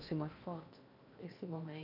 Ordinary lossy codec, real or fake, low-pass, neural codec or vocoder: none; fake; 5.4 kHz; codec, 16 kHz in and 24 kHz out, 0.8 kbps, FocalCodec, streaming, 65536 codes